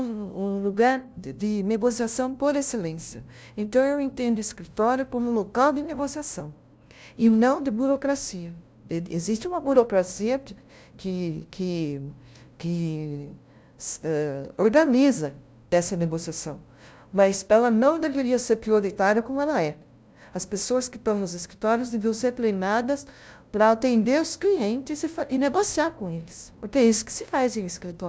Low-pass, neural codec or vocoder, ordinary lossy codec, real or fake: none; codec, 16 kHz, 0.5 kbps, FunCodec, trained on LibriTTS, 25 frames a second; none; fake